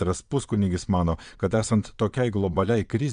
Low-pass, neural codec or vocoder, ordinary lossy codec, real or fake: 9.9 kHz; vocoder, 22.05 kHz, 80 mel bands, Vocos; AAC, 96 kbps; fake